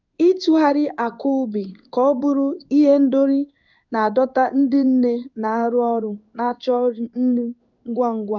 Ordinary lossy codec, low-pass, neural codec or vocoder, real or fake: none; 7.2 kHz; codec, 16 kHz in and 24 kHz out, 1 kbps, XY-Tokenizer; fake